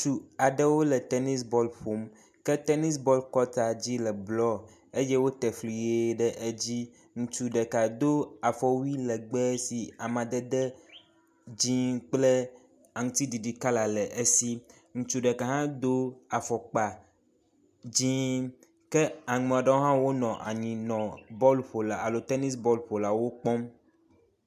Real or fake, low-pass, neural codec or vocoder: real; 14.4 kHz; none